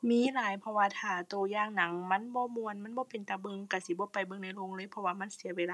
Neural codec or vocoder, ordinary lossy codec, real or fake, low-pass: none; none; real; none